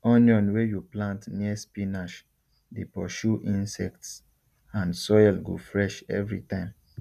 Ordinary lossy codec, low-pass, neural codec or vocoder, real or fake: none; 14.4 kHz; none; real